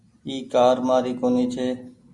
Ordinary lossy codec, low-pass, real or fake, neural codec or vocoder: MP3, 64 kbps; 10.8 kHz; real; none